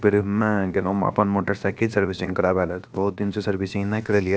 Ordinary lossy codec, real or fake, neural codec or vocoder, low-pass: none; fake; codec, 16 kHz, about 1 kbps, DyCAST, with the encoder's durations; none